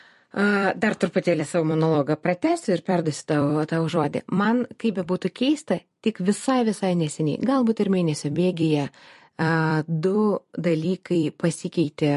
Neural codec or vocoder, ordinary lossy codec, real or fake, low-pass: vocoder, 44.1 kHz, 128 mel bands every 256 samples, BigVGAN v2; MP3, 48 kbps; fake; 14.4 kHz